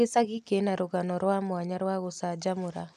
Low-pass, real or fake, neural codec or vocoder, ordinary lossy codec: 14.4 kHz; real; none; none